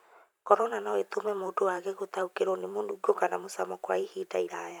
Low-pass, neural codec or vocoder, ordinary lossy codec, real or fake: none; vocoder, 44.1 kHz, 128 mel bands every 512 samples, BigVGAN v2; none; fake